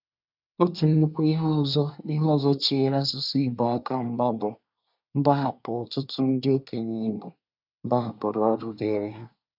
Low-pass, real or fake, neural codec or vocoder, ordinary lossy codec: 5.4 kHz; fake; codec, 24 kHz, 1 kbps, SNAC; none